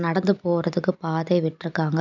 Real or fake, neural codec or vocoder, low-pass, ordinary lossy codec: real; none; 7.2 kHz; none